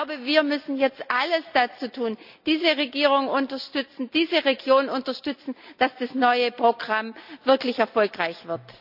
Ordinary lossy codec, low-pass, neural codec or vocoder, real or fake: none; 5.4 kHz; none; real